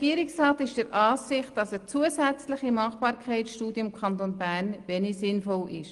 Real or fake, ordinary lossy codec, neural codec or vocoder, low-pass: real; Opus, 24 kbps; none; 10.8 kHz